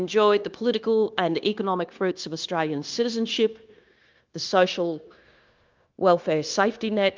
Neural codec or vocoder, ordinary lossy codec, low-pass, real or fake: codec, 16 kHz, 0.9 kbps, LongCat-Audio-Codec; Opus, 24 kbps; 7.2 kHz; fake